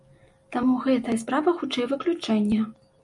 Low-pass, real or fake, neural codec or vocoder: 10.8 kHz; real; none